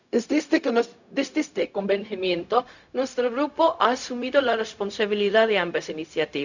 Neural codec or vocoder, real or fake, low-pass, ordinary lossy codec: codec, 16 kHz, 0.4 kbps, LongCat-Audio-Codec; fake; 7.2 kHz; none